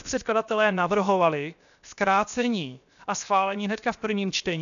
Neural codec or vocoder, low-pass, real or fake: codec, 16 kHz, 0.7 kbps, FocalCodec; 7.2 kHz; fake